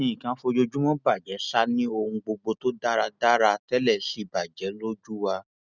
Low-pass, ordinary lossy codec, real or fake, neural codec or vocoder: 7.2 kHz; none; real; none